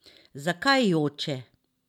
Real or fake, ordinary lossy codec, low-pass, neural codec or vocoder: real; none; 19.8 kHz; none